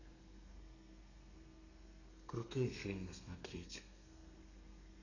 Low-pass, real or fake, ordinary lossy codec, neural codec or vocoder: 7.2 kHz; fake; none; codec, 32 kHz, 1.9 kbps, SNAC